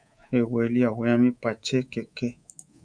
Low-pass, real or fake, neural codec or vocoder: 9.9 kHz; fake; codec, 24 kHz, 3.1 kbps, DualCodec